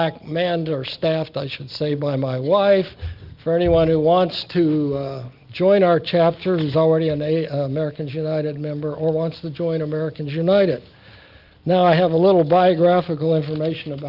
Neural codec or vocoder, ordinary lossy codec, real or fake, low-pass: none; Opus, 24 kbps; real; 5.4 kHz